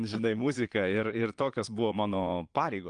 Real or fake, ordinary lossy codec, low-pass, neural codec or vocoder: fake; Opus, 24 kbps; 9.9 kHz; vocoder, 22.05 kHz, 80 mel bands, Vocos